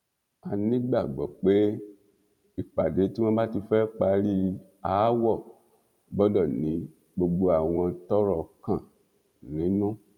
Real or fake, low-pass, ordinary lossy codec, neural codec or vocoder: fake; 19.8 kHz; none; vocoder, 44.1 kHz, 128 mel bands every 512 samples, BigVGAN v2